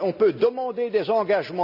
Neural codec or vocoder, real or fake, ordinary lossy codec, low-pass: none; real; none; 5.4 kHz